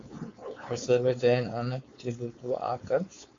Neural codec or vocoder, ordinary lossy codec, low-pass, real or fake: codec, 16 kHz, 4.8 kbps, FACodec; MP3, 48 kbps; 7.2 kHz; fake